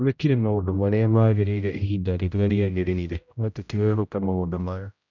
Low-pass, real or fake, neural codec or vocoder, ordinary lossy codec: 7.2 kHz; fake; codec, 16 kHz, 0.5 kbps, X-Codec, HuBERT features, trained on general audio; none